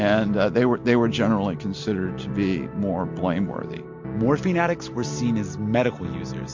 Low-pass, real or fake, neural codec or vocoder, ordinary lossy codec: 7.2 kHz; real; none; MP3, 48 kbps